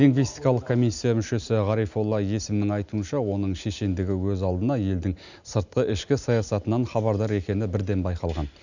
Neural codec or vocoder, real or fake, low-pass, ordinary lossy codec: none; real; 7.2 kHz; none